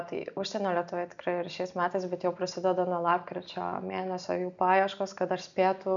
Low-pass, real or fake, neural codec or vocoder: 7.2 kHz; real; none